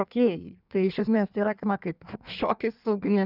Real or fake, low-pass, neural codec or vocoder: fake; 5.4 kHz; codec, 16 kHz in and 24 kHz out, 1.1 kbps, FireRedTTS-2 codec